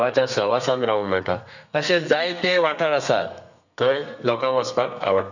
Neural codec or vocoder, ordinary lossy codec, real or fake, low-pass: codec, 32 kHz, 1.9 kbps, SNAC; none; fake; 7.2 kHz